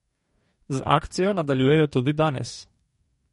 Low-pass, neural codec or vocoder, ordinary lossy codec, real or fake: 19.8 kHz; codec, 44.1 kHz, 2.6 kbps, DAC; MP3, 48 kbps; fake